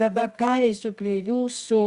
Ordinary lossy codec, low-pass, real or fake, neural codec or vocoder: Opus, 64 kbps; 10.8 kHz; fake; codec, 24 kHz, 0.9 kbps, WavTokenizer, medium music audio release